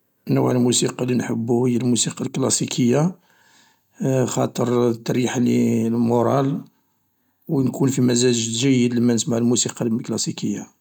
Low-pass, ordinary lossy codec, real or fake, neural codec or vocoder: 19.8 kHz; none; real; none